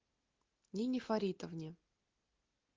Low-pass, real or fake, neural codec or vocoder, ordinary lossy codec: 7.2 kHz; real; none; Opus, 32 kbps